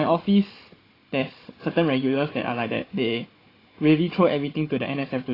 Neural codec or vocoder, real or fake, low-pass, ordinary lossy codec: codec, 16 kHz, 16 kbps, FunCodec, trained on Chinese and English, 50 frames a second; fake; 5.4 kHz; AAC, 24 kbps